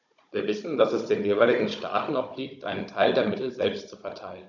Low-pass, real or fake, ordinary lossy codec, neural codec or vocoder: 7.2 kHz; fake; none; codec, 16 kHz, 16 kbps, FunCodec, trained on Chinese and English, 50 frames a second